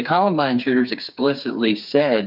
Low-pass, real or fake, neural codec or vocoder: 5.4 kHz; fake; codec, 16 kHz, 4 kbps, FreqCodec, smaller model